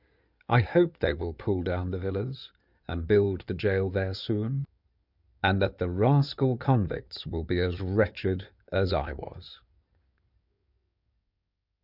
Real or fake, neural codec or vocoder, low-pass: fake; codec, 16 kHz in and 24 kHz out, 2.2 kbps, FireRedTTS-2 codec; 5.4 kHz